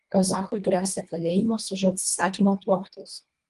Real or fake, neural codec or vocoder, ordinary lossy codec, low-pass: fake; codec, 24 kHz, 1.5 kbps, HILCodec; Opus, 32 kbps; 10.8 kHz